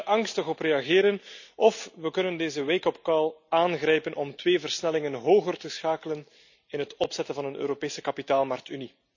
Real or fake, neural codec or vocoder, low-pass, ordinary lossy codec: real; none; 7.2 kHz; none